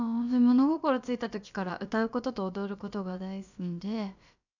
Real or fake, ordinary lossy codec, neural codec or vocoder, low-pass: fake; none; codec, 16 kHz, about 1 kbps, DyCAST, with the encoder's durations; 7.2 kHz